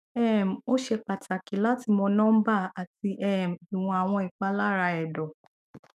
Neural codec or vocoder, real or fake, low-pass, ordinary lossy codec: autoencoder, 48 kHz, 128 numbers a frame, DAC-VAE, trained on Japanese speech; fake; 14.4 kHz; none